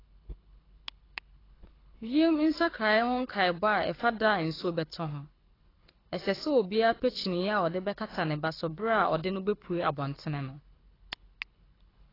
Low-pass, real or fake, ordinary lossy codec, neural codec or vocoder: 5.4 kHz; fake; AAC, 24 kbps; codec, 24 kHz, 6 kbps, HILCodec